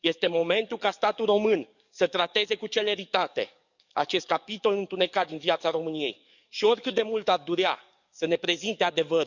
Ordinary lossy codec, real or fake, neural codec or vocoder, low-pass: none; fake; codec, 44.1 kHz, 7.8 kbps, DAC; 7.2 kHz